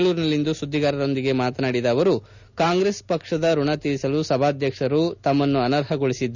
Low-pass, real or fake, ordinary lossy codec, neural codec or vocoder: 7.2 kHz; real; none; none